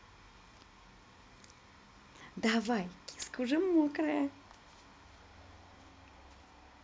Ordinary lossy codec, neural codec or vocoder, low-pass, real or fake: none; none; none; real